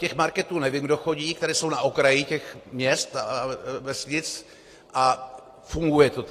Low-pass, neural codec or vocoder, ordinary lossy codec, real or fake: 14.4 kHz; none; AAC, 48 kbps; real